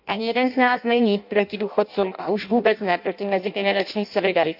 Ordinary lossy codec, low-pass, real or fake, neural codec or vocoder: AAC, 48 kbps; 5.4 kHz; fake; codec, 16 kHz in and 24 kHz out, 0.6 kbps, FireRedTTS-2 codec